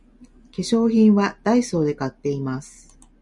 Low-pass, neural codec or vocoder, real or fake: 10.8 kHz; none; real